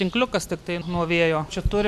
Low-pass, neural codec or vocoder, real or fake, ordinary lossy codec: 14.4 kHz; none; real; MP3, 96 kbps